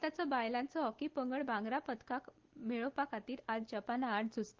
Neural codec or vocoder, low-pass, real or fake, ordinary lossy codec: none; 7.2 kHz; real; Opus, 16 kbps